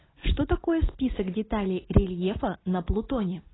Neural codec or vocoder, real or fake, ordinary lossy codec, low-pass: none; real; AAC, 16 kbps; 7.2 kHz